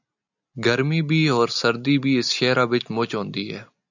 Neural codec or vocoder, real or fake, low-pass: none; real; 7.2 kHz